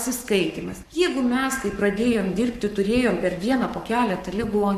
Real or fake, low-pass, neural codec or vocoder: fake; 14.4 kHz; codec, 44.1 kHz, 7.8 kbps, Pupu-Codec